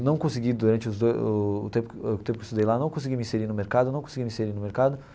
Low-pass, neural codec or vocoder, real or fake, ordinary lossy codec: none; none; real; none